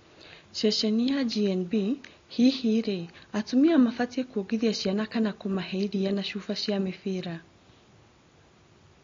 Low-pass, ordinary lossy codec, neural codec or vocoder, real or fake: 7.2 kHz; AAC, 32 kbps; none; real